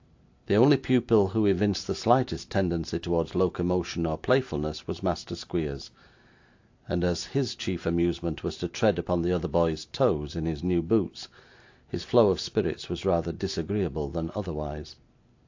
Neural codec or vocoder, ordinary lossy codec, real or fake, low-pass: none; AAC, 48 kbps; real; 7.2 kHz